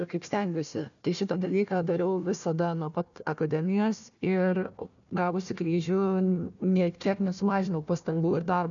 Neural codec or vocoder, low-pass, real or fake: codec, 16 kHz, 1 kbps, FunCodec, trained on Chinese and English, 50 frames a second; 7.2 kHz; fake